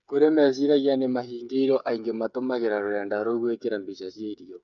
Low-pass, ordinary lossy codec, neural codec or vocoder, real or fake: 7.2 kHz; none; codec, 16 kHz, 8 kbps, FreqCodec, smaller model; fake